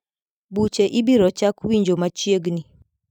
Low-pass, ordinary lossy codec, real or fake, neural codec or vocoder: 19.8 kHz; none; fake; vocoder, 44.1 kHz, 128 mel bands every 256 samples, BigVGAN v2